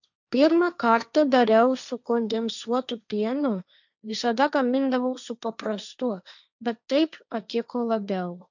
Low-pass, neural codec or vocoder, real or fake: 7.2 kHz; codec, 16 kHz, 1.1 kbps, Voila-Tokenizer; fake